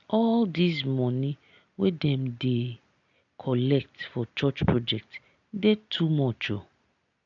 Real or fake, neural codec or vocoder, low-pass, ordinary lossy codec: real; none; 7.2 kHz; none